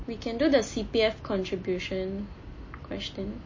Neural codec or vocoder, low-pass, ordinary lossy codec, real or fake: none; 7.2 kHz; MP3, 32 kbps; real